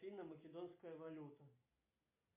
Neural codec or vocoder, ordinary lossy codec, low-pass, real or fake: none; MP3, 24 kbps; 3.6 kHz; real